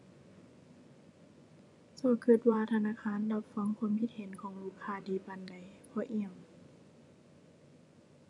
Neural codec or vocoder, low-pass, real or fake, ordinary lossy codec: vocoder, 24 kHz, 100 mel bands, Vocos; 10.8 kHz; fake; none